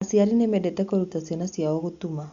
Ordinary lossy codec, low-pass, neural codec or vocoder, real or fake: Opus, 64 kbps; 7.2 kHz; none; real